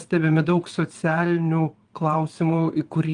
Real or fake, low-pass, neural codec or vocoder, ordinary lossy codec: fake; 9.9 kHz; vocoder, 22.05 kHz, 80 mel bands, WaveNeXt; Opus, 24 kbps